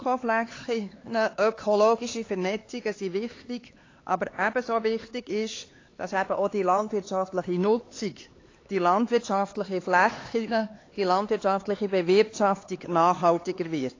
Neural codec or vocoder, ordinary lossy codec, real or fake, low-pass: codec, 16 kHz, 4 kbps, X-Codec, HuBERT features, trained on LibriSpeech; AAC, 32 kbps; fake; 7.2 kHz